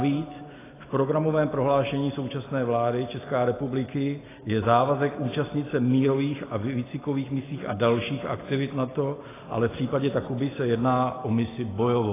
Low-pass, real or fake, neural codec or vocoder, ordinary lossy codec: 3.6 kHz; real; none; AAC, 16 kbps